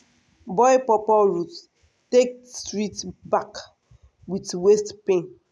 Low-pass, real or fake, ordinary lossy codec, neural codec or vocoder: none; real; none; none